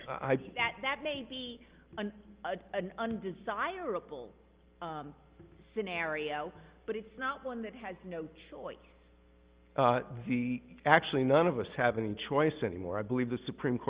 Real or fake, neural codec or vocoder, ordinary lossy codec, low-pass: real; none; Opus, 32 kbps; 3.6 kHz